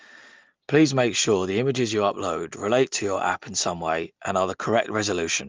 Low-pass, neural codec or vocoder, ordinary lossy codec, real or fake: 7.2 kHz; none; Opus, 32 kbps; real